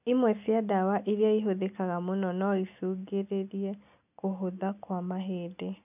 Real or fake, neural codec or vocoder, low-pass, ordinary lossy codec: real; none; 3.6 kHz; none